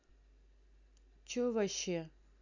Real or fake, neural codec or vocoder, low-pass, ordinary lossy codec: real; none; 7.2 kHz; none